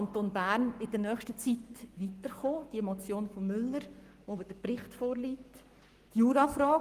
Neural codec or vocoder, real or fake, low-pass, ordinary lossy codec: codec, 44.1 kHz, 7.8 kbps, Pupu-Codec; fake; 14.4 kHz; Opus, 24 kbps